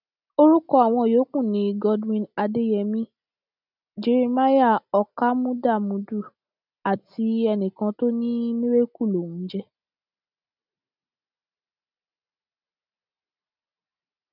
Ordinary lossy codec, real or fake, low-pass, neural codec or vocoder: none; real; 5.4 kHz; none